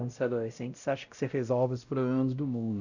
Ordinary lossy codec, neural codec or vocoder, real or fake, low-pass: none; codec, 16 kHz, 0.5 kbps, X-Codec, WavLM features, trained on Multilingual LibriSpeech; fake; 7.2 kHz